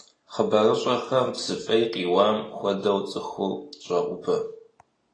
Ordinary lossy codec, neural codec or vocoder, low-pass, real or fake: AAC, 32 kbps; none; 9.9 kHz; real